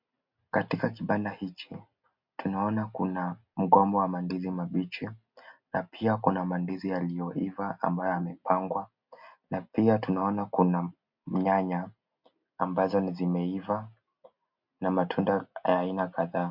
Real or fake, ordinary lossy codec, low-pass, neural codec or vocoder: real; MP3, 48 kbps; 5.4 kHz; none